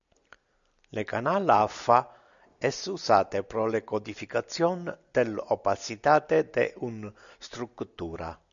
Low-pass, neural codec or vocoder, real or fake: 7.2 kHz; none; real